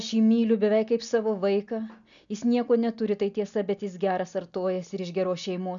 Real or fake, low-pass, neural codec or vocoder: real; 7.2 kHz; none